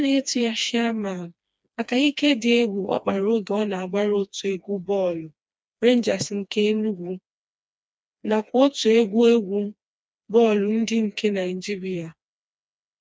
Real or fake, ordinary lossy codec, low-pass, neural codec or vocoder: fake; none; none; codec, 16 kHz, 2 kbps, FreqCodec, smaller model